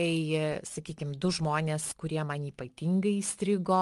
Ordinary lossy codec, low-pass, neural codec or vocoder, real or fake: Opus, 32 kbps; 10.8 kHz; none; real